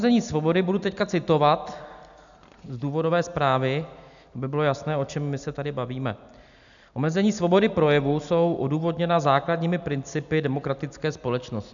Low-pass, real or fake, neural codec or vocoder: 7.2 kHz; real; none